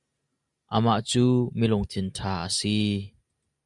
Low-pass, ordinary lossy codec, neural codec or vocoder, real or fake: 10.8 kHz; Opus, 64 kbps; none; real